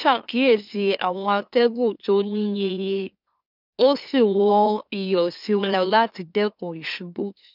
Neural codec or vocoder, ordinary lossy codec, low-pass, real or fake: autoencoder, 44.1 kHz, a latent of 192 numbers a frame, MeloTTS; none; 5.4 kHz; fake